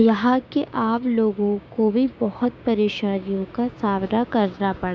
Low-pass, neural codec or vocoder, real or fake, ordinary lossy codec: none; codec, 16 kHz, 6 kbps, DAC; fake; none